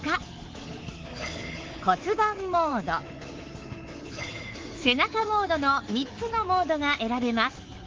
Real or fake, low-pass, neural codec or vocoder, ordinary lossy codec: fake; 7.2 kHz; codec, 24 kHz, 3.1 kbps, DualCodec; Opus, 16 kbps